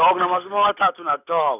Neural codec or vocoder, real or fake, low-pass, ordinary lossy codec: none; real; 3.6 kHz; none